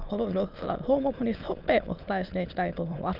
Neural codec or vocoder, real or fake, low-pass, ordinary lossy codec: autoencoder, 22.05 kHz, a latent of 192 numbers a frame, VITS, trained on many speakers; fake; 5.4 kHz; Opus, 32 kbps